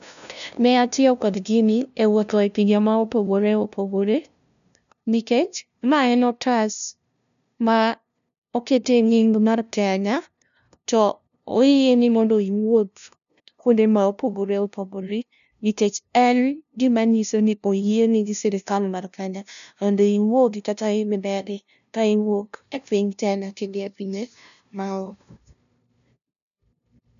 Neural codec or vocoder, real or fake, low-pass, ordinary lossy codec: codec, 16 kHz, 0.5 kbps, FunCodec, trained on LibriTTS, 25 frames a second; fake; 7.2 kHz; none